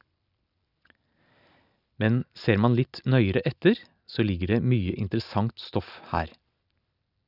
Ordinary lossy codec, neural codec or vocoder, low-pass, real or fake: none; none; 5.4 kHz; real